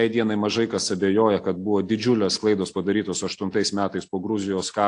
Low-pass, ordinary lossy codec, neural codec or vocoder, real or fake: 9.9 kHz; AAC, 48 kbps; none; real